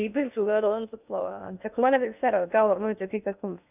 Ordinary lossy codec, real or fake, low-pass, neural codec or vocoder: none; fake; 3.6 kHz; codec, 16 kHz in and 24 kHz out, 0.6 kbps, FocalCodec, streaming, 2048 codes